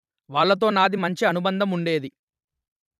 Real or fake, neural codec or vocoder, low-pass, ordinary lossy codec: fake; vocoder, 44.1 kHz, 128 mel bands every 256 samples, BigVGAN v2; 14.4 kHz; none